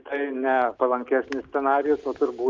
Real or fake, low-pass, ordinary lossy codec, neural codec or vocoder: real; 7.2 kHz; Opus, 24 kbps; none